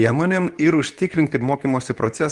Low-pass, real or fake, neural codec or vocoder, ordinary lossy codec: 10.8 kHz; fake; codec, 24 kHz, 0.9 kbps, WavTokenizer, medium speech release version 2; Opus, 32 kbps